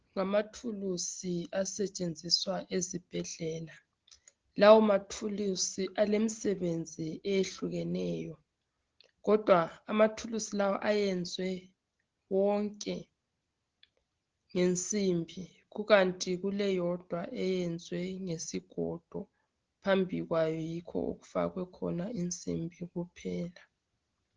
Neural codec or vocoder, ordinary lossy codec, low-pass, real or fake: none; Opus, 16 kbps; 7.2 kHz; real